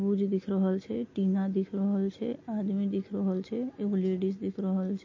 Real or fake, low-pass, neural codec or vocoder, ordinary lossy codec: real; 7.2 kHz; none; MP3, 32 kbps